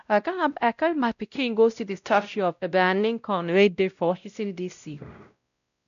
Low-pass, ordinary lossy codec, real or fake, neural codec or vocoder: 7.2 kHz; none; fake; codec, 16 kHz, 0.5 kbps, X-Codec, WavLM features, trained on Multilingual LibriSpeech